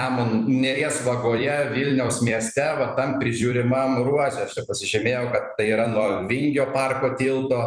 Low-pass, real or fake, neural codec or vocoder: 9.9 kHz; fake; vocoder, 44.1 kHz, 128 mel bands every 256 samples, BigVGAN v2